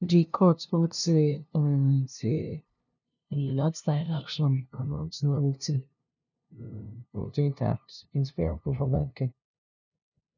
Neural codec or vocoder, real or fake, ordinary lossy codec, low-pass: codec, 16 kHz, 0.5 kbps, FunCodec, trained on LibriTTS, 25 frames a second; fake; none; 7.2 kHz